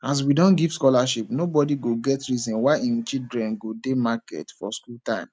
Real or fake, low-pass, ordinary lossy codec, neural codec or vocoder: real; none; none; none